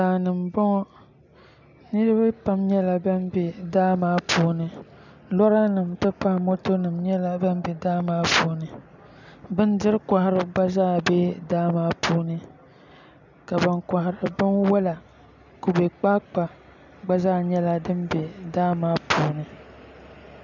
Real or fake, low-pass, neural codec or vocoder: real; 7.2 kHz; none